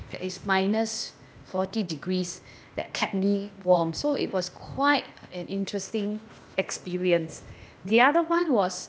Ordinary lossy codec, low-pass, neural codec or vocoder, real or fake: none; none; codec, 16 kHz, 0.8 kbps, ZipCodec; fake